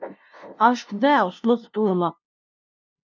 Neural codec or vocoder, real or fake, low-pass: codec, 16 kHz, 0.5 kbps, FunCodec, trained on LibriTTS, 25 frames a second; fake; 7.2 kHz